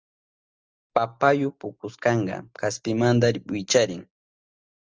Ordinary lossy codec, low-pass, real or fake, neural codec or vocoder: Opus, 24 kbps; 7.2 kHz; real; none